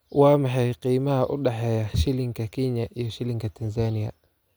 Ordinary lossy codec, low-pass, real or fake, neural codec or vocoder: none; none; real; none